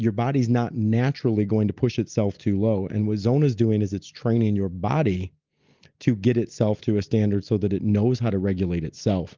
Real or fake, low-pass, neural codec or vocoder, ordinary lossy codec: real; 7.2 kHz; none; Opus, 32 kbps